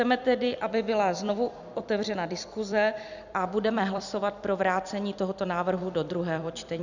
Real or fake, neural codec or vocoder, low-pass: fake; vocoder, 44.1 kHz, 128 mel bands every 256 samples, BigVGAN v2; 7.2 kHz